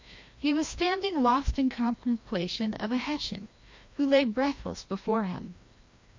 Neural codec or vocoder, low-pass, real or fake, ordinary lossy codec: codec, 16 kHz, 1 kbps, FreqCodec, larger model; 7.2 kHz; fake; MP3, 48 kbps